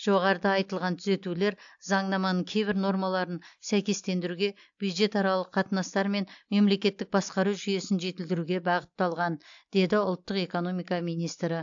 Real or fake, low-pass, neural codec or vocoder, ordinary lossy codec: real; 7.2 kHz; none; AAC, 64 kbps